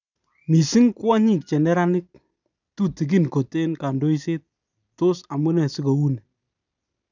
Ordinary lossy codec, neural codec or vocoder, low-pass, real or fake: none; none; 7.2 kHz; real